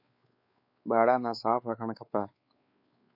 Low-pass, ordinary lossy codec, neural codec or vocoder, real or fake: 5.4 kHz; MP3, 32 kbps; codec, 16 kHz, 4 kbps, X-Codec, WavLM features, trained on Multilingual LibriSpeech; fake